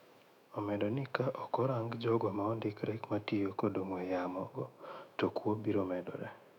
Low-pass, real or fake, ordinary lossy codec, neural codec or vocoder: 19.8 kHz; fake; none; autoencoder, 48 kHz, 128 numbers a frame, DAC-VAE, trained on Japanese speech